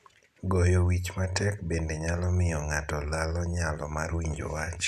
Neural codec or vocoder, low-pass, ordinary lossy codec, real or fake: none; 14.4 kHz; none; real